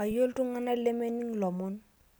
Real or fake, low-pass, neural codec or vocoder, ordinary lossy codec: real; none; none; none